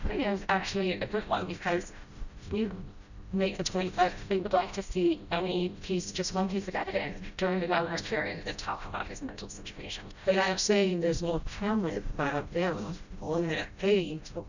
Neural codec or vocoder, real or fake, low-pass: codec, 16 kHz, 0.5 kbps, FreqCodec, smaller model; fake; 7.2 kHz